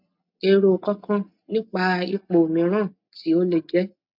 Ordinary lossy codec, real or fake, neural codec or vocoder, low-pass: MP3, 48 kbps; real; none; 5.4 kHz